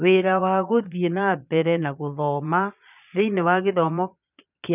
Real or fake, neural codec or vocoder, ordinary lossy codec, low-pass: fake; vocoder, 22.05 kHz, 80 mel bands, Vocos; none; 3.6 kHz